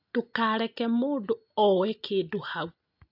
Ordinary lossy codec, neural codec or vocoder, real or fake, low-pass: AAC, 48 kbps; none; real; 5.4 kHz